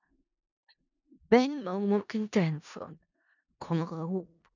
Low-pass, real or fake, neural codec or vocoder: 7.2 kHz; fake; codec, 16 kHz in and 24 kHz out, 0.4 kbps, LongCat-Audio-Codec, four codebook decoder